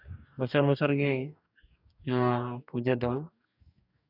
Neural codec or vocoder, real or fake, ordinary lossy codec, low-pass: codec, 44.1 kHz, 2.6 kbps, DAC; fake; none; 5.4 kHz